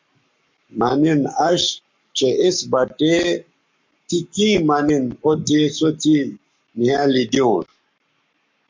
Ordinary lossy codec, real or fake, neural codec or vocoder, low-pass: MP3, 48 kbps; fake; codec, 44.1 kHz, 7.8 kbps, Pupu-Codec; 7.2 kHz